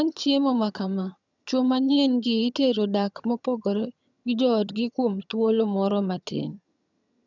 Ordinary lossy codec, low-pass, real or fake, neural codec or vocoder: none; 7.2 kHz; fake; vocoder, 22.05 kHz, 80 mel bands, HiFi-GAN